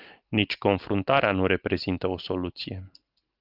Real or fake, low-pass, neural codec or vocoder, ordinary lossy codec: real; 5.4 kHz; none; Opus, 24 kbps